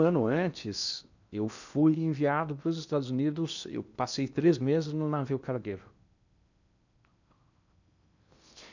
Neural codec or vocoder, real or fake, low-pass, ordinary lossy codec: codec, 16 kHz in and 24 kHz out, 0.8 kbps, FocalCodec, streaming, 65536 codes; fake; 7.2 kHz; none